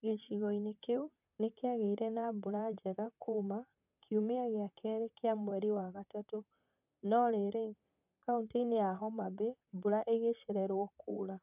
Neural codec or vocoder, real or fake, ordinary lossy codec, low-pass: vocoder, 44.1 kHz, 128 mel bands, Pupu-Vocoder; fake; none; 3.6 kHz